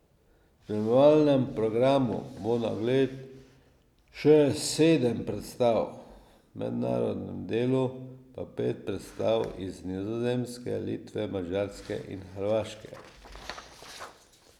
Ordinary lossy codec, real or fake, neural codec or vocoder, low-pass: none; real; none; 19.8 kHz